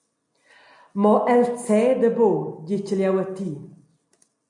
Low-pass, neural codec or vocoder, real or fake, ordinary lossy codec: 10.8 kHz; none; real; MP3, 48 kbps